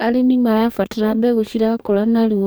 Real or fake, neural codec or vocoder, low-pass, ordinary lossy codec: fake; codec, 44.1 kHz, 2.6 kbps, DAC; none; none